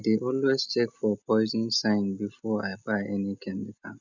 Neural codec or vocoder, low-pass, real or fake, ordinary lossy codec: none; 7.2 kHz; real; none